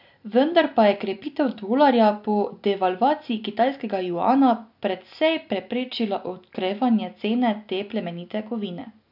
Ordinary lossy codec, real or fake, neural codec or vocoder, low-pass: none; real; none; 5.4 kHz